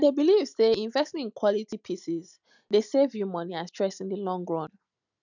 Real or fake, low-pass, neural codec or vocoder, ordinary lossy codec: real; 7.2 kHz; none; none